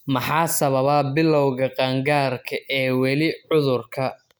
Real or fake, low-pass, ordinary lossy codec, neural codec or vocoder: real; none; none; none